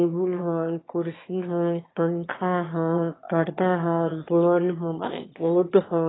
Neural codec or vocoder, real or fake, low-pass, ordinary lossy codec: autoencoder, 22.05 kHz, a latent of 192 numbers a frame, VITS, trained on one speaker; fake; 7.2 kHz; AAC, 16 kbps